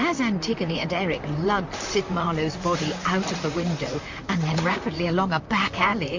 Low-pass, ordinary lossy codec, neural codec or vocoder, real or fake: 7.2 kHz; MP3, 48 kbps; vocoder, 44.1 kHz, 128 mel bands, Pupu-Vocoder; fake